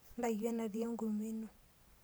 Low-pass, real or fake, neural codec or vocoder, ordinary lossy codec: none; fake; vocoder, 44.1 kHz, 128 mel bands every 512 samples, BigVGAN v2; none